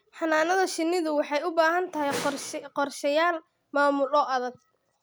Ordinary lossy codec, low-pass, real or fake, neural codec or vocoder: none; none; real; none